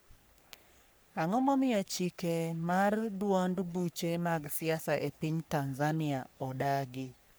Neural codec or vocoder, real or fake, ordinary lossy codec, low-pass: codec, 44.1 kHz, 3.4 kbps, Pupu-Codec; fake; none; none